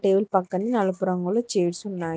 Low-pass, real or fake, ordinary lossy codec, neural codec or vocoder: none; real; none; none